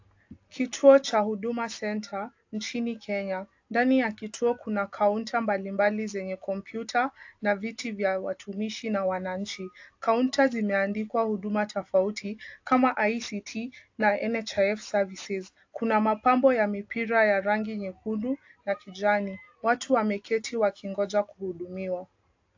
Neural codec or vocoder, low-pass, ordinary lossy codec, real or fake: none; 7.2 kHz; AAC, 48 kbps; real